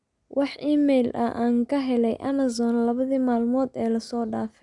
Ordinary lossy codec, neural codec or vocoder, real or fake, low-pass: none; none; real; 10.8 kHz